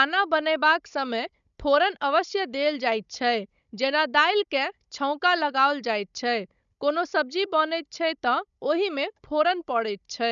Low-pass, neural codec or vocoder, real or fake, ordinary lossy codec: 7.2 kHz; codec, 16 kHz, 16 kbps, FunCodec, trained on Chinese and English, 50 frames a second; fake; none